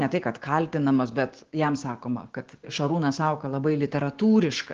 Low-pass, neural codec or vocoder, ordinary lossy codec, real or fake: 7.2 kHz; codec, 16 kHz, 6 kbps, DAC; Opus, 16 kbps; fake